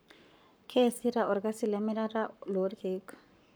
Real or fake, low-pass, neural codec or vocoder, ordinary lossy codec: fake; none; codec, 44.1 kHz, 7.8 kbps, Pupu-Codec; none